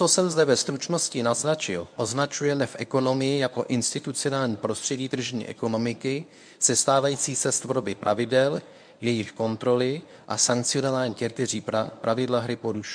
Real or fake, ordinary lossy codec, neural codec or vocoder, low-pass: fake; MP3, 64 kbps; codec, 24 kHz, 0.9 kbps, WavTokenizer, medium speech release version 1; 9.9 kHz